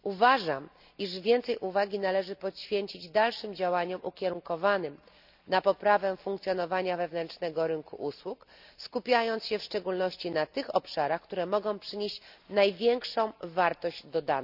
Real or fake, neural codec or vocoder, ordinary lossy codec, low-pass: real; none; none; 5.4 kHz